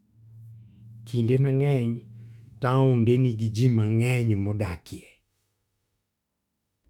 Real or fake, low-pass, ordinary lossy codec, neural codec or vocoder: fake; 19.8 kHz; none; autoencoder, 48 kHz, 32 numbers a frame, DAC-VAE, trained on Japanese speech